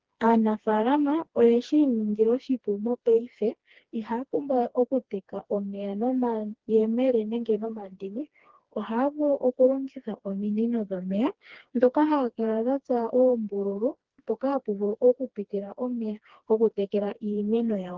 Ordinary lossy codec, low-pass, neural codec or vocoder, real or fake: Opus, 16 kbps; 7.2 kHz; codec, 16 kHz, 2 kbps, FreqCodec, smaller model; fake